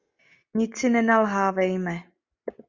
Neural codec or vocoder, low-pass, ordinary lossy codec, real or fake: none; 7.2 kHz; Opus, 64 kbps; real